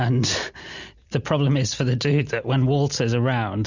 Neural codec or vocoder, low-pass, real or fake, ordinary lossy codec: none; 7.2 kHz; real; Opus, 64 kbps